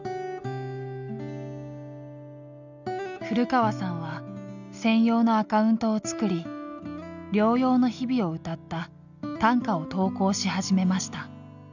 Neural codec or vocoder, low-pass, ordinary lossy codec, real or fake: none; 7.2 kHz; none; real